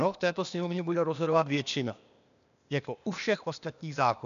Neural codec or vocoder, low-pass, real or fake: codec, 16 kHz, 0.8 kbps, ZipCodec; 7.2 kHz; fake